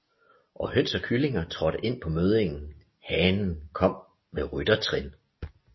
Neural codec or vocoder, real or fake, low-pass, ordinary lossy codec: none; real; 7.2 kHz; MP3, 24 kbps